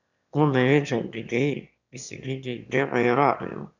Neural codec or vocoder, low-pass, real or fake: autoencoder, 22.05 kHz, a latent of 192 numbers a frame, VITS, trained on one speaker; 7.2 kHz; fake